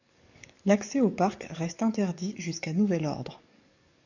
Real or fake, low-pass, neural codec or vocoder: fake; 7.2 kHz; codec, 44.1 kHz, 7.8 kbps, DAC